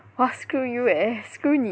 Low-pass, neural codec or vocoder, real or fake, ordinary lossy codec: none; none; real; none